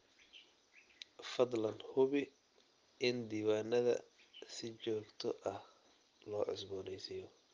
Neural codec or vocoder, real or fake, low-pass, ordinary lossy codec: none; real; 7.2 kHz; Opus, 32 kbps